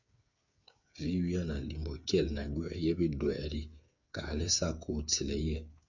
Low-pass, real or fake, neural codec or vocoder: 7.2 kHz; fake; codec, 16 kHz, 8 kbps, FreqCodec, smaller model